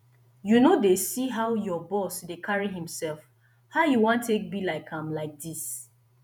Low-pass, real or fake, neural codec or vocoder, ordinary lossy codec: none; fake; vocoder, 48 kHz, 128 mel bands, Vocos; none